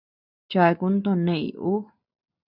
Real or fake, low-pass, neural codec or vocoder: real; 5.4 kHz; none